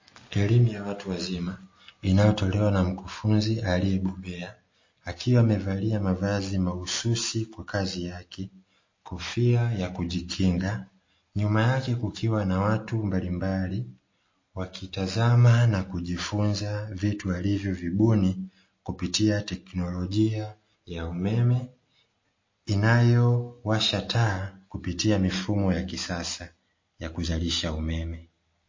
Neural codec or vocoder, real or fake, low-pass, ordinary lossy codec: none; real; 7.2 kHz; MP3, 32 kbps